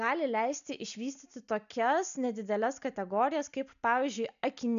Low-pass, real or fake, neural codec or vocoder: 7.2 kHz; real; none